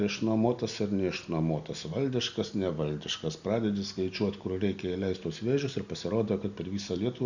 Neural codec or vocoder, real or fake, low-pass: none; real; 7.2 kHz